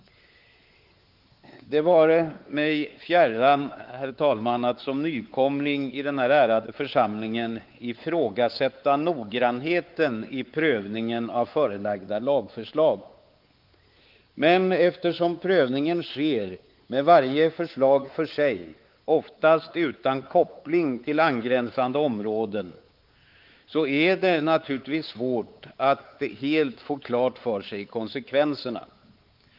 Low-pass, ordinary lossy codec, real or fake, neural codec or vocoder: 5.4 kHz; Opus, 32 kbps; fake; codec, 16 kHz, 4 kbps, X-Codec, WavLM features, trained on Multilingual LibriSpeech